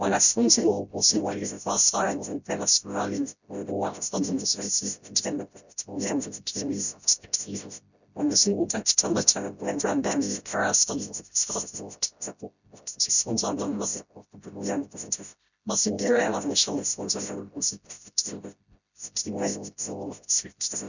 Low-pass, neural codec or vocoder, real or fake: 7.2 kHz; codec, 16 kHz, 0.5 kbps, FreqCodec, smaller model; fake